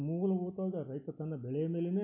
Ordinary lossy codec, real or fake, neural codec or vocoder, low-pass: none; real; none; 3.6 kHz